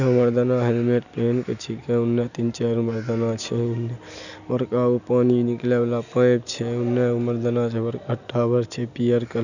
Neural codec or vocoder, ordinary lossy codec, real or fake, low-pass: none; none; real; 7.2 kHz